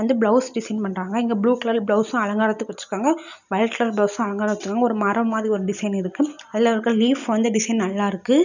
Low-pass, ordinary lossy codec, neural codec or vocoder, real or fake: 7.2 kHz; none; none; real